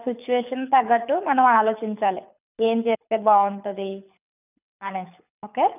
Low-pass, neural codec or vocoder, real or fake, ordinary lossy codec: 3.6 kHz; codec, 24 kHz, 6 kbps, HILCodec; fake; none